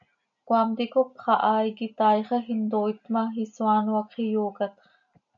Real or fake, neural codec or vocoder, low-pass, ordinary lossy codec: real; none; 7.2 kHz; MP3, 64 kbps